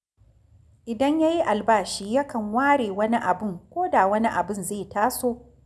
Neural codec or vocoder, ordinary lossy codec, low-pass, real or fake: none; none; none; real